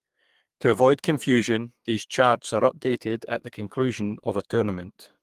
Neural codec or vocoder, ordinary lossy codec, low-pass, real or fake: codec, 32 kHz, 1.9 kbps, SNAC; Opus, 32 kbps; 14.4 kHz; fake